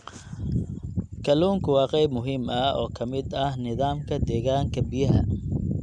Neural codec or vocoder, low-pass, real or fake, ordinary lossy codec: none; 9.9 kHz; real; none